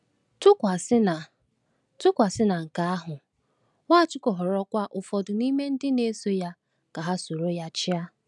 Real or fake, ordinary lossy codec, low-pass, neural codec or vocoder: real; none; 10.8 kHz; none